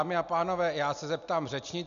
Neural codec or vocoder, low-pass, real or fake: none; 7.2 kHz; real